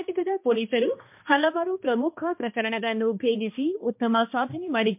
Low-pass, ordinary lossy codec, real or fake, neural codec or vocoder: 3.6 kHz; MP3, 32 kbps; fake; codec, 16 kHz, 1 kbps, X-Codec, HuBERT features, trained on balanced general audio